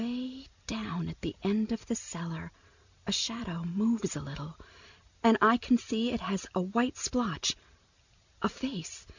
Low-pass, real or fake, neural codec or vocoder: 7.2 kHz; real; none